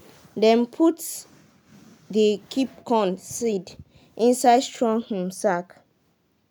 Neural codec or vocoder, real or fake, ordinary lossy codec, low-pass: none; real; none; none